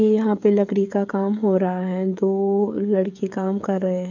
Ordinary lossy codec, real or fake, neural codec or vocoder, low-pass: none; fake; codec, 16 kHz, 16 kbps, FreqCodec, smaller model; 7.2 kHz